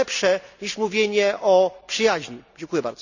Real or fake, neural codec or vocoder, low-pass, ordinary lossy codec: real; none; 7.2 kHz; none